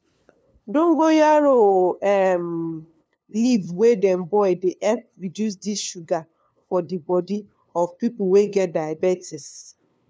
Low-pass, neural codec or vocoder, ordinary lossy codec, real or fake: none; codec, 16 kHz, 2 kbps, FunCodec, trained on LibriTTS, 25 frames a second; none; fake